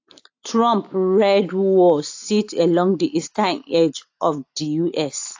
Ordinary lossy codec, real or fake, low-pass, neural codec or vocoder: AAC, 48 kbps; real; 7.2 kHz; none